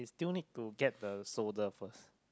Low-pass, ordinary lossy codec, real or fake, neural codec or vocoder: none; none; real; none